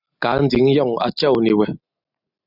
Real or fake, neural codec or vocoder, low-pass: real; none; 5.4 kHz